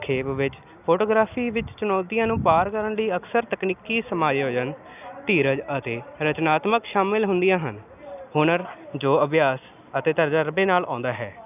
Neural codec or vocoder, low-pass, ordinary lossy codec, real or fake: none; 3.6 kHz; none; real